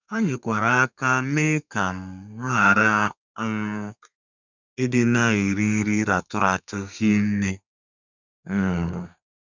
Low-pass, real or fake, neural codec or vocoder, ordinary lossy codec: 7.2 kHz; fake; codec, 32 kHz, 1.9 kbps, SNAC; none